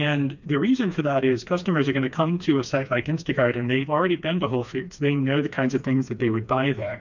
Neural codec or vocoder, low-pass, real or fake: codec, 16 kHz, 2 kbps, FreqCodec, smaller model; 7.2 kHz; fake